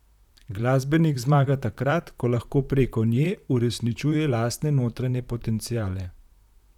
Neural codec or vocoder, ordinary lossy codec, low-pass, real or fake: vocoder, 44.1 kHz, 128 mel bands, Pupu-Vocoder; none; 19.8 kHz; fake